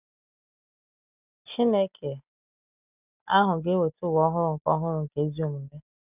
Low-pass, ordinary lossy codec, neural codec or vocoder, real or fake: 3.6 kHz; none; none; real